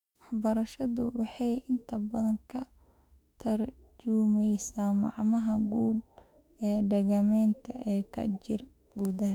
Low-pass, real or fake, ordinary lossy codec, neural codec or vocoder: 19.8 kHz; fake; none; autoencoder, 48 kHz, 32 numbers a frame, DAC-VAE, trained on Japanese speech